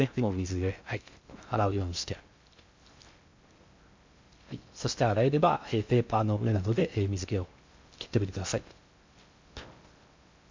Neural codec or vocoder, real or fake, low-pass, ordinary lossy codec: codec, 16 kHz in and 24 kHz out, 0.6 kbps, FocalCodec, streaming, 2048 codes; fake; 7.2 kHz; MP3, 64 kbps